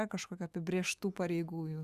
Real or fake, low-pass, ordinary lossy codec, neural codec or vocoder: fake; 14.4 kHz; Opus, 64 kbps; autoencoder, 48 kHz, 128 numbers a frame, DAC-VAE, trained on Japanese speech